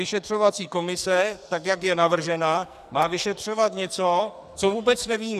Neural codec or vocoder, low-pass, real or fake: codec, 44.1 kHz, 2.6 kbps, SNAC; 14.4 kHz; fake